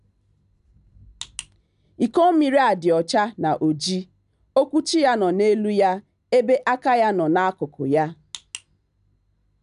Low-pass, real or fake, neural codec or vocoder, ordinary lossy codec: 10.8 kHz; real; none; none